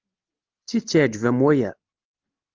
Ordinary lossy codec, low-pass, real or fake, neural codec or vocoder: Opus, 32 kbps; 7.2 kHz; real; none